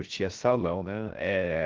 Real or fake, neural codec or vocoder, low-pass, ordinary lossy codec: fake; codec, 16 kHz, 0.3 kbps, FocalCodec; 7.2 kHz; Opus, 16 kbps